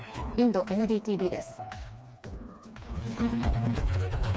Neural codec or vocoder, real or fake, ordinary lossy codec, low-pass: codec, 16 kHz, 2 kbps, FreqCodec, smaller model; fake; none; none